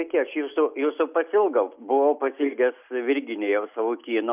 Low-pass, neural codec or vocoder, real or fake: 3.6 kHz; none; real